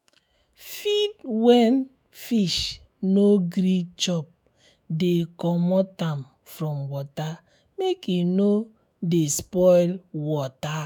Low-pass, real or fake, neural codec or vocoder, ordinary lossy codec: none; fake; autoencoder, 48 kHz, 128 numbers a frame, DAC-VAE, trained on Japanese speech; none